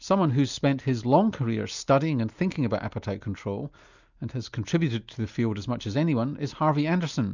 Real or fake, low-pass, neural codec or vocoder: real; 7.2 kHz; none